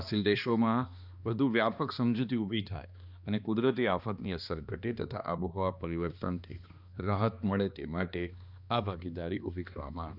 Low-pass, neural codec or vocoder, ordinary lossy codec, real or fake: 5.4 kHz; codec, 16 kHz, 2 kbps, X-Codec, HuBERT features, trained on balanced general audio; Opus, 64 kbps; fake